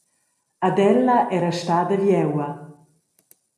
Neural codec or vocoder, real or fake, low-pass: none; real; 14.4 kHz